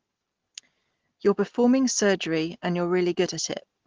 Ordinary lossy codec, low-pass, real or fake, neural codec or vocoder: Opus, 16 kbps; 7.2 kHz; real; none